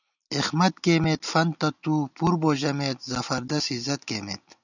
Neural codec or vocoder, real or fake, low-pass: none; real; 7.2 kHz